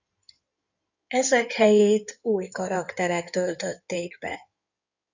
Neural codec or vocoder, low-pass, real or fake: codec, 16 kHz in and 24 kHz out, 2.2 kbps, FireRedTTS-2 codec; 7.2 kHz; fake